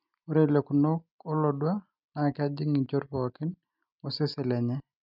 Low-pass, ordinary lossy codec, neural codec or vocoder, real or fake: 5.4 kHz; none; none; real